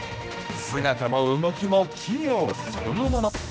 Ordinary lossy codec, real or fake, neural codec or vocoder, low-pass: none; fake; codec, 16 kHz, 1 kbps, X-Codec, HuBERT features, trained on general audio; none